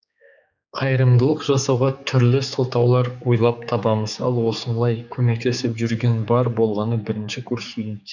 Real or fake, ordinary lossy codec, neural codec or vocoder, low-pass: fake; none; codec, 16 kHz, 4 kbps, X-Codec, HuBERT features, trained on general audio; 7.2 kHz